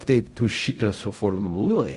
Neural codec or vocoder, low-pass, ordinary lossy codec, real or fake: codec, 16 kHz in and 24 kHz out, 0.4 kbps, LongCat-Audio-Codec, fine tuned four codebook decoder; 10.8 kHz; Opus, 64 kbps; fake